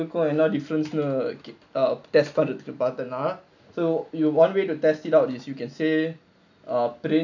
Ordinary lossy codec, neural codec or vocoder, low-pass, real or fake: none; none; 7.2 kHz; real